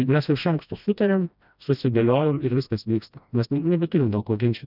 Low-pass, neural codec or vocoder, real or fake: 5.4 kHz; codec, 16 kHz, 1 kbps, FreqCodec, smaller model; fake